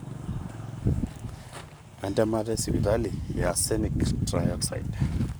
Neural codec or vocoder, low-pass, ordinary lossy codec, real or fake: codec, 44.1 kHz, 7.8 kbps, Pupu-Codec; none; none; fake